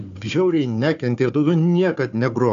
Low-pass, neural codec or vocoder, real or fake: 7.2 kHz; codec, 16 kHz, 4 kbps, FunCodec, trained on Chinese and English, 50 frames a second; fake